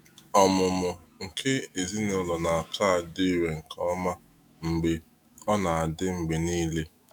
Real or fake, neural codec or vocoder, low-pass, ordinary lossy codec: fake; autoencoder, 48 kHz, 128 numbers a frame, DAC-VAE, trained on Japanese speech; none; none